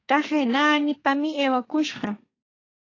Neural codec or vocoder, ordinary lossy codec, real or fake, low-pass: codec, 32 kHz, 1.9 kbps, SNAC; AAC, 32 kbps; fake; 7.2 kHz